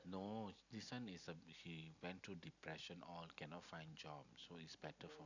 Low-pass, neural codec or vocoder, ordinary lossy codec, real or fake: 7.2 kHz; none; none; real